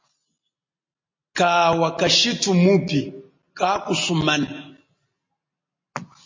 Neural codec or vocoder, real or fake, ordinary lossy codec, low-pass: none; real; MP3, 32 kbps; 7.2 kHz